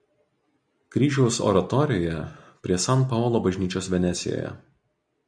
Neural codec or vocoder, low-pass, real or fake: none; 9.9 kHz; real